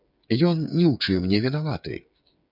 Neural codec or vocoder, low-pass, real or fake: codec, 16 kHz, 8 kbps, FreqCodec, smaller model; 5.4 kHz; fake